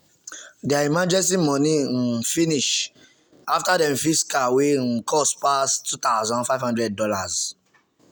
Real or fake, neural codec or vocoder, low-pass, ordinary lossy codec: real; none; none; none